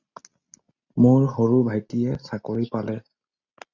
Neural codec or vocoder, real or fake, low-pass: none; real; 7.2 kHz